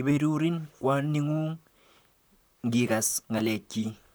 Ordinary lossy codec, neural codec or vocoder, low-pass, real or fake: none; vocoder, 44.1 kHz, 128 mel bands, Pupu-Vocoder; none; fake